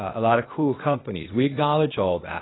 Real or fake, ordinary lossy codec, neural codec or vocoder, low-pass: fake; AAC, 16 kbps; codec, 16 kHz, 0.8 kbps, ZipCodec; 7.2 kHz